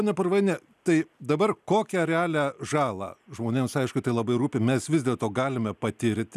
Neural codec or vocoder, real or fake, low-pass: none; real; 14.4 kHz